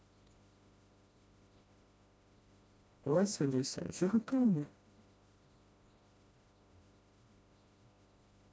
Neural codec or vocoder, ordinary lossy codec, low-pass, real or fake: codec, 16 kHz, 1 kbps, FreqCodec, smaller model; none; none; fake